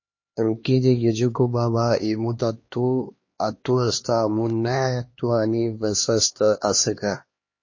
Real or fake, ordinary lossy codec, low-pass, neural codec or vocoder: fake; MP3, 32 kbps; 7.2 kHz; codec, 16 kHz, 2 kbps, X-Codec, HuBERT features, trained on LibriSpeech